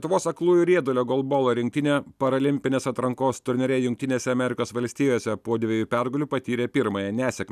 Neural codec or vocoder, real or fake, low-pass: none; real; 14.4 kHz